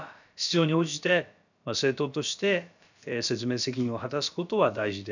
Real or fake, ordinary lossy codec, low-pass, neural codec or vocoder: fake; none; 7.2 kHz; codec, 16 kHz, about 1 kbps, DyCAST, with the encoder's durations